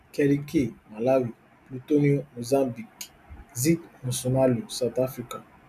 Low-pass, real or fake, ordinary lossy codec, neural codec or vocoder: 14.4 kHz; real; none; none